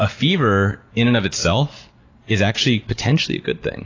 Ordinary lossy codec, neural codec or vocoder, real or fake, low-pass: AAC, 32 kbps; none; real; 7.2 kHz